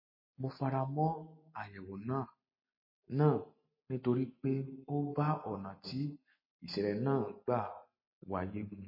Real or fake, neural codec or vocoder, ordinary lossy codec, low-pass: real; none; MP3, 24 kbps; 5.4 kHz